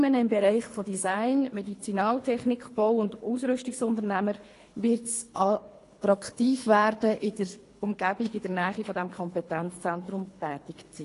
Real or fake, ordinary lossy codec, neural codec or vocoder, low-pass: fake; AAC, 48 kbps; codec, 24 kHz, 3 kbps, HILCodec; 10.8 kHz